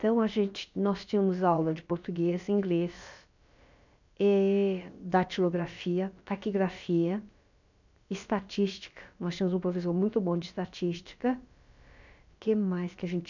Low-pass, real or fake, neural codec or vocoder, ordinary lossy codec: 7.2 kHz; fake; codec, 16 kHz, about 1 kbps, DyCAST, with the encoder's durations; none